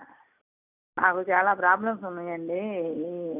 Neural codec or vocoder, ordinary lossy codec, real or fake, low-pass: none; none; real; 3.6 kHz